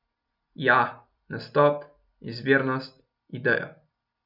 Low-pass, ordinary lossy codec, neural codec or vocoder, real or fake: 5.4 kHz; none; none; real